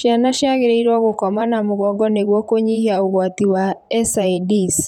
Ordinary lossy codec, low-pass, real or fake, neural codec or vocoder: none; 19.8 kHz; fake; vocoder, 44.1 kHz, 128 mel bands, Pupu-Vocoder